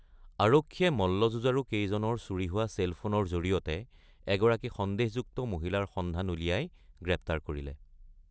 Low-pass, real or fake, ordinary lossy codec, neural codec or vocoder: none; real; none; none